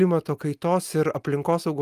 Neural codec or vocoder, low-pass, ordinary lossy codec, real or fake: none; 14.4 kHz; Opus, 32 kbps; real